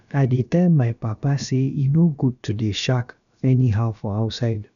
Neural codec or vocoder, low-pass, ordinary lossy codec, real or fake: codec, 16 kHz, about 1 kbps, DyCAST, with the encoder's durations; 7.2 kHz; none; fake